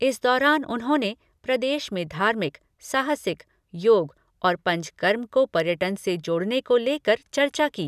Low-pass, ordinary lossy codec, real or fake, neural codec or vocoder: 14.4 kHz; none; real; none